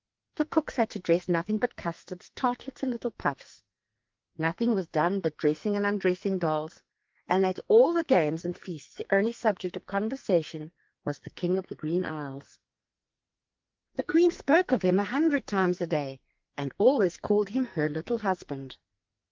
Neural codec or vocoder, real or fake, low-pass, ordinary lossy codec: codec, 44.1 kHz, 2.6 kbps, SNAC; fake; 7.2 kHz; Opus, 32 kbps